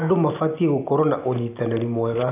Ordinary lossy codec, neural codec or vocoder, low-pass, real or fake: none; none; 3.6 kHz; real